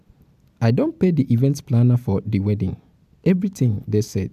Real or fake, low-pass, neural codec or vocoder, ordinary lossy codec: real; 14.4 kHz; none; AAC, 96 kbps